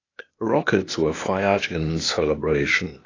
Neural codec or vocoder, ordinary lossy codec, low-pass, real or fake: codec, 16 kHz, 0.8 kbps, ZipCodec; AAC, 32 kbps; 7.2 kHz; fake